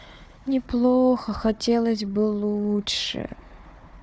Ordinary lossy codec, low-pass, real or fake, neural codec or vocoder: none; none; fake; codec, 16 kHz, 4 kbps, FunCodec, trained on Chinese and English, 50 frames a second